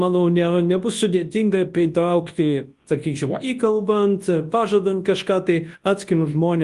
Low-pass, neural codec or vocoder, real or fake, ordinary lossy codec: 10.8 kHz; codec, 24 kHz, 0.9 kbps, WavTokenizer, large speech release; fake; Opus, 24 kbps